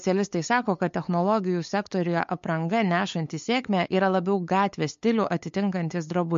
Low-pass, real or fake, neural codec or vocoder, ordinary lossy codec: 7.2 kHz; fake; codec, 16 kHz, 8 kbps, FunCodec, trained on LibriTTS, 25 frames a second; MP3, 48 kbps